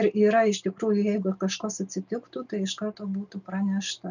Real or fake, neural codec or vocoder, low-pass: real; none; 7.2 kHz